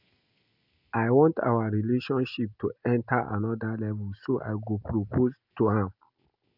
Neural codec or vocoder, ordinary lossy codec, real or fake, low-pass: none; none; real; 5.4 kHz